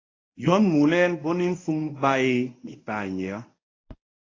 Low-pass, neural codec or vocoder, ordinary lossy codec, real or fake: 7.2 kHz; codec, 24 kHz, 0.9 kbps, WavTokenizer, medium speech release version 1; AAC, 32 kbps; fake